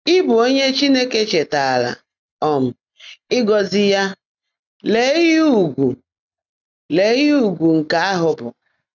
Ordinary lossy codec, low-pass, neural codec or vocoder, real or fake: none; 7.2 kHz; none; real